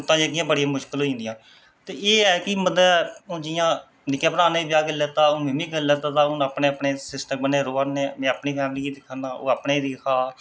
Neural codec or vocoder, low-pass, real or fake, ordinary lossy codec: none; none; real; none